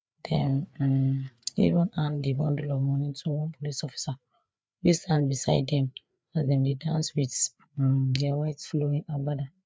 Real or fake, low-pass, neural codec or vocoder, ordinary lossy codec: fake; none; codec, 16 kHz, 4 kbps, FreqCodec, larger model; none